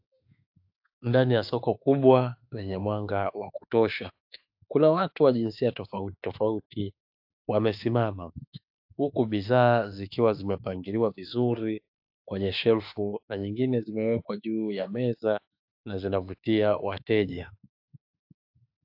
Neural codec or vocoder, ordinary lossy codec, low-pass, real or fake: autoencoder, 48 kHz, 32 numbers a frame, DAC-VAE, trained on Japanese speech; AAC, 48 kbps; 5.4 kHz; fake